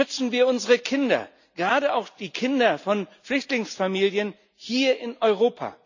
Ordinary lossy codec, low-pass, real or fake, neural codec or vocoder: none; 7.2 kHz; real; none